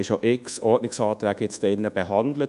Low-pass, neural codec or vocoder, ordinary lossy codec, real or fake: 10.8 kHz; codec, 24 kHz, 1.2 kbps, DualCodec; none; fake